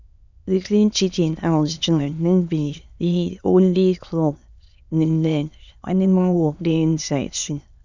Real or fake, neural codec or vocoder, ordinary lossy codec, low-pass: fake; autoencoder, 22.05 kHz, a latent of 192 numbers a frame, VITS, trained on many speakers; none; 7.2 kHz